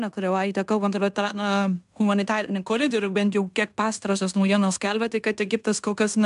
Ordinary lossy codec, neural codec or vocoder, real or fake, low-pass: MP3, 96 kbps; codec, 16 kHz in and 24 kHz out, 0.9 kbps, LongCat-Audio-Codec, fine tuned four codebook decoder; fake; 10.8 kHz